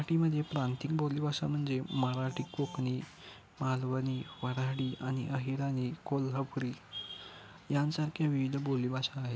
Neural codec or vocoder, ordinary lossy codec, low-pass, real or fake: none; none; none; real